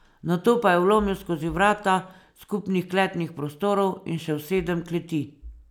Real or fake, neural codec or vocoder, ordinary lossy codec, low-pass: real; none; none; 19.8 kHz